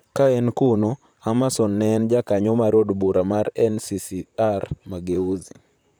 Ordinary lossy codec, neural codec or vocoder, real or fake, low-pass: none; vocoder, 44.1 kHz, 128 mel bands, Pupu-Vocoder; fake; none